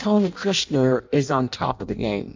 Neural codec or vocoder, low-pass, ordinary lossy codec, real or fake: codec, 16 kHz in and 24 kHz out, 0.6 kbps, FireRedTTS-2 codec; 7.2 kHz; MP3, 64 kbps; fake